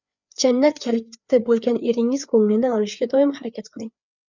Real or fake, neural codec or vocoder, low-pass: fake; codec, 16 kHz, 4 kbps, FreqCodec, larger model; 7.2 kHz